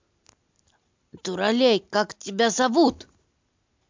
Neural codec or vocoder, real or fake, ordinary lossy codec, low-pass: none; real; MP3, 64 kbps; 7.2 kHz